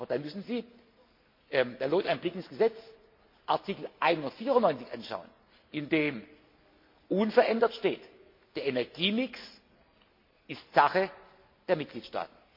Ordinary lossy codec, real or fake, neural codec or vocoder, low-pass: MP3, 32 kbps; real; none; 5.4 kHz